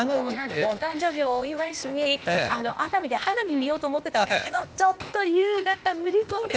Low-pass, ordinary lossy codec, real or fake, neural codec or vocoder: none; none; fake; codec, 16 kHz, 0.8 kbps, ZipCodec